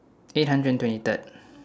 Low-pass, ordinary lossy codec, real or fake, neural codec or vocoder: none; none; real; none